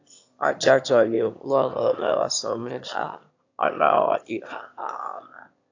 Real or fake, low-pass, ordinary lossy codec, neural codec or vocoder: fake; 7.2 kHz; AAC, 48 kbps; autoencoder, 22.05 kHz, a latent of 192 numbers a frame, VITS, trained on one speaker